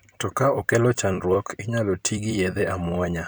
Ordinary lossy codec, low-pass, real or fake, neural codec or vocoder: none; none; fake; vocoder, 44.1 kHz, 128 mel bands every 256 samples, BigVGAN v2